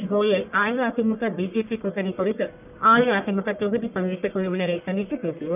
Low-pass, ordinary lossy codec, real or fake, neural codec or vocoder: 3.6 kHz; none; fake; codec, 44.1 kHz, 1.7 kbps, Pupu-Codec